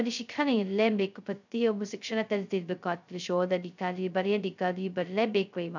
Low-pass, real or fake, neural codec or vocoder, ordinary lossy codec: 7.2 kHz; fake; codec, 16 kHz, 0.2 kbps, FocalCodec; none